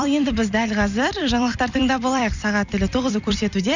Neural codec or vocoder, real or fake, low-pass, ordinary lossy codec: vocoder, 44.1 kHz, 128 mel bands every 256 samples, BigVGAN v2; fake; 7.2 kHz; none